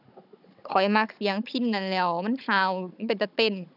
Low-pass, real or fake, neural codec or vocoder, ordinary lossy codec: 5.4 kHz; fake; codec, 16 kHz, 16 kbps, FunCodec, trained on Chinese and English, 50 frames a second; none